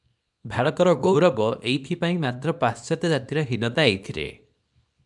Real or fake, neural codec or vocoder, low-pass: fake; codec, 24 kHz, 0.9 kbps, WavTokenizer, small release; 10.8 kHz